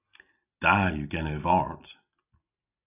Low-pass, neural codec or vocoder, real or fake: 3.6 kHz; none; real